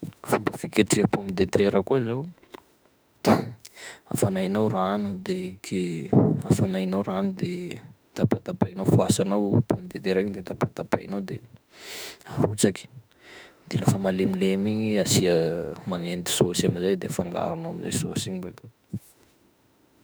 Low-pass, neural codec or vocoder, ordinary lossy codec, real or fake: none; autoencoder, 48 kHz, 32 numbers a frame, DAC-VAE, trained on Japanese speech; none; fake